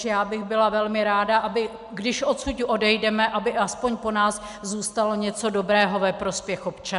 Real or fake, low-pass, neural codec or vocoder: real; 10.8 kHz; none